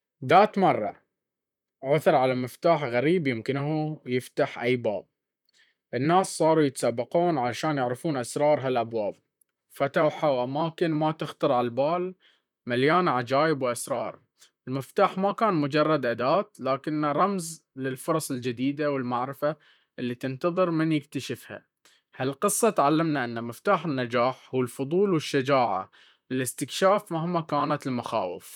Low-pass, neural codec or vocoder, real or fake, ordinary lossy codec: 19.8 kHz; vocoder, 44.1 kHz, 128 mel bands, Pupu-Vocoder; fake; none